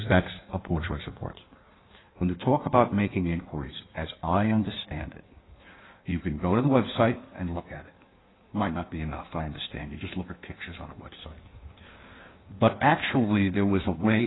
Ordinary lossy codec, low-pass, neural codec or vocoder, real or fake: AAC, 16 kbps; 7.2 kHz; codec, 16 kHz in and 24 kHz out, 1.1 kbps, FireRedTTS-2 codec; fake